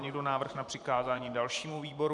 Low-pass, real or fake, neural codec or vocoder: 10.8 kHz; fake; vocoder, 44.1 kHz, 128 mel bands every 512 samples, BigVGAN v2